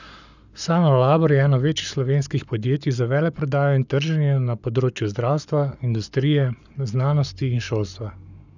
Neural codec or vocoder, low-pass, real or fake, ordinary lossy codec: codec, 44.1 kHz, 7.8 kbps, Pupu-Codec; 7.2 kHz; fake; none